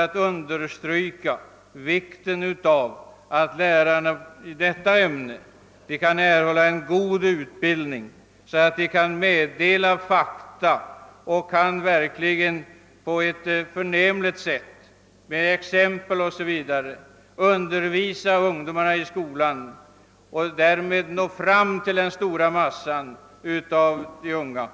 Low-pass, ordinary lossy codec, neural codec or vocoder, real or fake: none; none; none; real